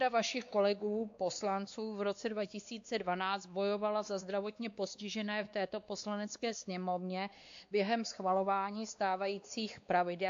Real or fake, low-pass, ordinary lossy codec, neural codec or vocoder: fake; 7.2 kHz; AAC, 64 kbps; codec, 16 kHz, 2 kbps, X-Codec, WavLM features, trained on Multilingual LibriSpeech